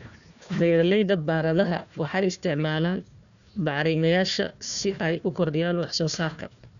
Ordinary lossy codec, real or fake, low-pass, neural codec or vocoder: none; fake; 7.2 kHz; codec, 16 kHz, 1 kbps, FunCodec, trained on Chinese and English, 50 frames a second